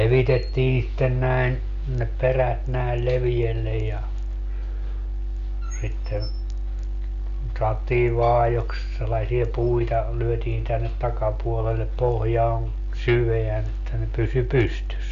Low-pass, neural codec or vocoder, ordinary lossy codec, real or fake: 7.2 kHz; none; none; real